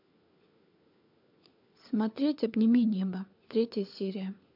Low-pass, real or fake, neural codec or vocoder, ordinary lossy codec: 5.4 kHz; fake; codec, 16 kHz, 4 kbps, FunCodec, trained on LibriTTS, 50 frames a second; MP3, 48 kbps